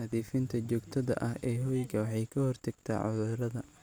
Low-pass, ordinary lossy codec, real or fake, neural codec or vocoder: none; none; real; none